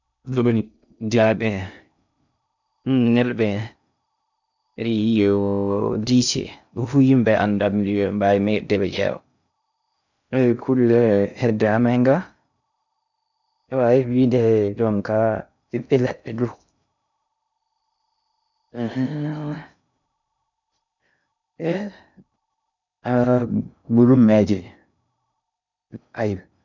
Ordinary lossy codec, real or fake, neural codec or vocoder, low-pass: none; fake; codec, 16 kHz in and 24 kHz out, 0.6 kbps, FocalCodec, streaming, 2048 codes; 7.2 kHz